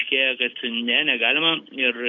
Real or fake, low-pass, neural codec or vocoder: real; 7.2 kHz; none